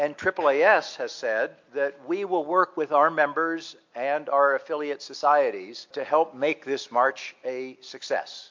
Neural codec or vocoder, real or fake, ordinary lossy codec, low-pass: none; real; MP3, 48 kbps; 7.2 kHz